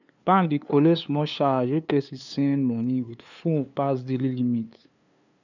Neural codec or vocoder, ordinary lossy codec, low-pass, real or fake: codec, 16 kHz, 2 kbps, FunCodec, trained on LibriTTS, 25 frames a second; none; 7.2 kHz; fake